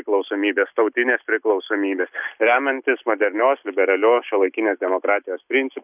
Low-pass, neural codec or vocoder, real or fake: 3.6 kHz; none; real